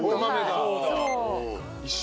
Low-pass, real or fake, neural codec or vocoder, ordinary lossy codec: none; real; none; none